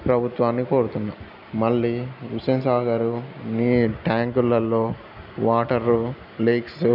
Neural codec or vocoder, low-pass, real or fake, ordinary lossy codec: none; 5.4 kHz; real; none